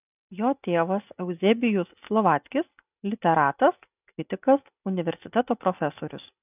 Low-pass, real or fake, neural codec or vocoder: 3.6 kHz; real; none